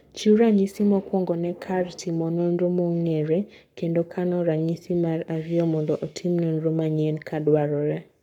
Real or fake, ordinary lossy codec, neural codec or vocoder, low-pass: fake; none; codec, 44.1 kHz, 7.8 kbps, Pupu-Codec; 19.8 kHz